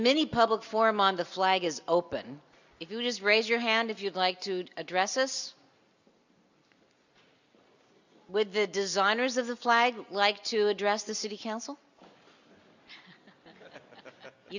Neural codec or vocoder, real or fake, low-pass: none; real; 7.2 kHz